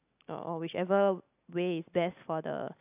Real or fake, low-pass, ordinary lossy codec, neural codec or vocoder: real; 3.6 kHz; AAC, 32 kbps; none